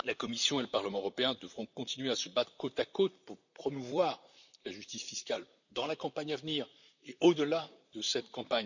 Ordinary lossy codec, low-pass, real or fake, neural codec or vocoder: none; 7.2 kHz; fake; vocoder, 44.1 kHz, 128 mel bands, Pupu-Vocoder